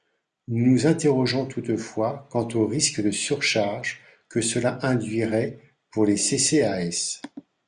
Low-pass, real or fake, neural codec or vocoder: 9.9 kHz; real; none